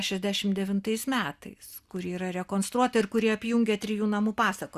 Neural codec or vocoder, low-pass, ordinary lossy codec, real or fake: none; 14.4 kHz; Opus, 64 kbps; real